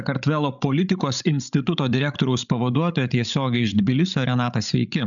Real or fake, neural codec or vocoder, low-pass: fake; codec, 16 kHz, 16 kbps, FreqCodec, larger model; 7.2 kHz